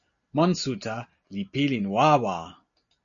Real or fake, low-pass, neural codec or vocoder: real; 7.2 kHz; none